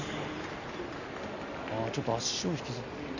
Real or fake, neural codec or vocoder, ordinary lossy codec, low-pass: real; none; none; 7.2 kHz